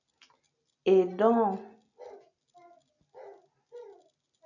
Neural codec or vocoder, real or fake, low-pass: none; real; 7.2 kHz